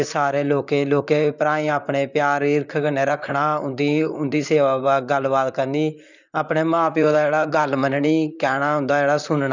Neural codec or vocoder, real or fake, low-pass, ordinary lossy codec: vocoder, 44.1 kHz, 128 mel bands, Pupu-Vocoder; fake; 7.2 kHz; none